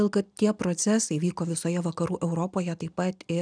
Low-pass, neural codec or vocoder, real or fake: 9.9 kHz; none; real